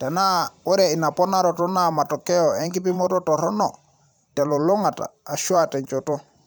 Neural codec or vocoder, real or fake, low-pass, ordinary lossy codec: vocoder, 44.1 kHz, 128 mel bands every 512 samples, BigVGAN v2; fake; none; none